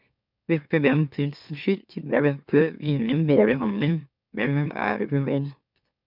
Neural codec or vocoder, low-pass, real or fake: autoencoder, 44.1 kHz, a latent of 192 numbers a frame, MeloTTS; 5.4 kHz; fake